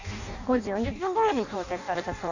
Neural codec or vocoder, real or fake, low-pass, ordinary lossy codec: codec, 16 kHz in and 24 kHz out, 0.6 kbps, FireRedTTS-2 codec; fake; 7.2 kHz; none